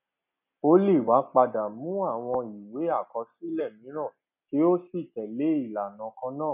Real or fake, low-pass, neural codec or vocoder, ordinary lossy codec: real; 3.6 kHz; none; none